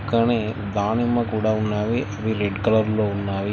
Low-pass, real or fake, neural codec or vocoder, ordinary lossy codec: none; real; none; none